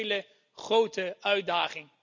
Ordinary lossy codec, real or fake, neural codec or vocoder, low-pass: none; real; none; 7.2 kHz